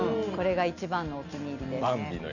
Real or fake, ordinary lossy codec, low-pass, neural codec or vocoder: real; none; 7.2 kHz; none